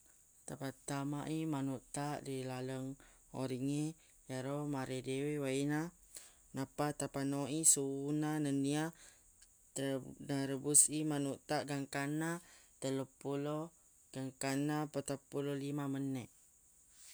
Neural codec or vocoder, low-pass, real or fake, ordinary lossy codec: none; none; real; none